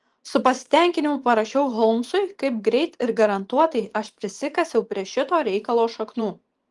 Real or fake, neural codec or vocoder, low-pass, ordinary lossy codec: fake; vocoder, 24 kHz, 100 mel bands, Vocos; 10.8 kHz; Opus, 24 kbps